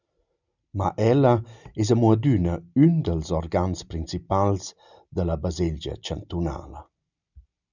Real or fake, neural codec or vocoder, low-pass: real; none; 7.2 kHz